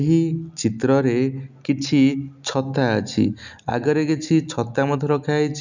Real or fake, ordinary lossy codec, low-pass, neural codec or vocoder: real; none; 7.2 kHz; none